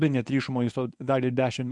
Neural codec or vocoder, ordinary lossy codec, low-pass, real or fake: codec, 24 kHz, 0.9 kbps, WavTokenizer, medium speech release version 1; MP3, 96 kbps; 10.8 kHz; fake